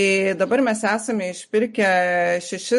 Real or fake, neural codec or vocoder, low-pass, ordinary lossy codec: real; none; 14.4 kHz; MP3, 48 kbps